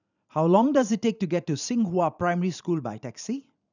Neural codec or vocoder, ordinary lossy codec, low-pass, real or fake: vocoder, 44.1 kHz, 128 mel bands every 512 samples, BigVGAN v2; none; 7.2 kHz; fake